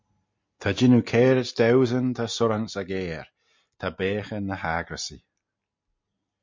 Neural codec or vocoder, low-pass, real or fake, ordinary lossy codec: none; 7.2 kHz; real; MP3, 48 kbps